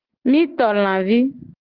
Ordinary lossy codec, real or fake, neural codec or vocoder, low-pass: Opus, 16 kbps; real; none; 5.4 kHz